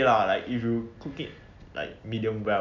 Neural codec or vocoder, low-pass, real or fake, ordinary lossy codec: none; 7.2 kHz; real; none